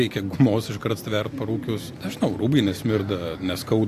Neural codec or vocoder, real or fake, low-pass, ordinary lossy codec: none; real; 14.4 kHz; AAC, 64 kbps